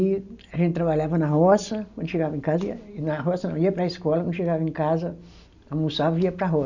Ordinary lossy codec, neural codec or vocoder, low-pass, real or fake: none; none; 7.2 kHz; real